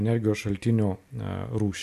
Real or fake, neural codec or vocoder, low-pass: real; none; 14.4 kHz